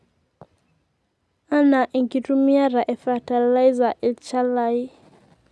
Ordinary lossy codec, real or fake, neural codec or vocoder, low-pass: none; real; none; none